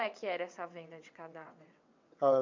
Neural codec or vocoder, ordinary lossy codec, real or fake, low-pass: vocoder, 22.05 kHz, 80 mel bands, WaveNeXt; MP3, 64 kbps; fake; 7.2 kHz